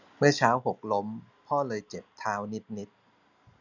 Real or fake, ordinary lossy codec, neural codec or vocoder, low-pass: real; none; none; 7.2 kHz